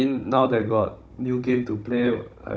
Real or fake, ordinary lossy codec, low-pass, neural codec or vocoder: fake; none; none; codec, 16 kHz, 16 kbps, FunCodec, trained on Chinese and English, 50 frames a second